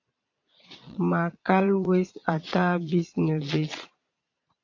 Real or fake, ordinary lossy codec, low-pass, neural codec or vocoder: fake; AAC, 48 kbps; 7.2 kHz; vocoder, 22.05 kHz, 80 mel bands, Vocos